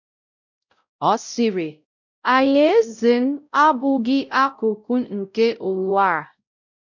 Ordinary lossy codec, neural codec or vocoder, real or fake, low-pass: none; codec, 16 kHz, 0.5 kbps, X-Codec, WavLM features, trained on Multilingual LibriSpeech; fake; 7.2 kHz